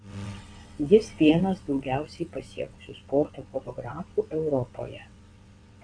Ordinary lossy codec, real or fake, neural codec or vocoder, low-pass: AAC, 48 kbps; fake; vocoder, 22.05 kHz, 80 mel bands, WaveNeXt; 9.9 kHz